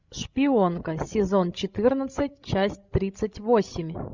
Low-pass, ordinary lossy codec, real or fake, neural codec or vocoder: 7.2 kHz; Opus, 64 kbps; fake; codec, 16 kHz, 16 kbps, FreqCodec, larger model